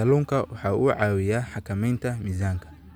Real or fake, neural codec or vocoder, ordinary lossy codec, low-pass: real; none; none; none